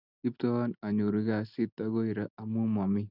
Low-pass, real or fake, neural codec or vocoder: 5.4 kHz; real; none